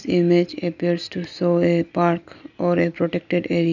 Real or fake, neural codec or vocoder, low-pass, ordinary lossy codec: real; none; 7.2 kHz; none